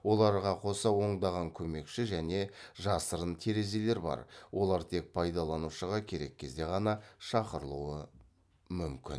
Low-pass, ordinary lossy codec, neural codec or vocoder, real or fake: none; none; none; real